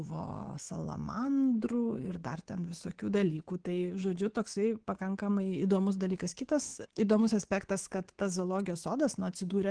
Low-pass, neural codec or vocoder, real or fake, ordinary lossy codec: 9.9 kHz; codec, 24 kHz, 3.1 kbps, DualCodec; fake; Opus, 16 kbps